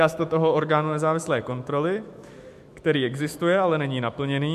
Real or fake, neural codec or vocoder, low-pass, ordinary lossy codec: fake; autoencoder, 48 kHz, 128 numbers a frame, DAC-VAE, trained on Japanese speech; 14.4 kHz; MP3, 64 kbps